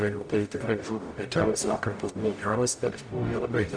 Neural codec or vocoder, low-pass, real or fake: codec, 44.1 kHz, 0.9 kbps, DAC; 9.9 kHz; fake